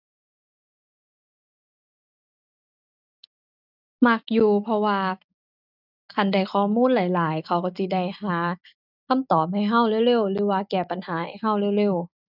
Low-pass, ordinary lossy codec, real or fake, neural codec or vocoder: 5.4 kHz; none; real; none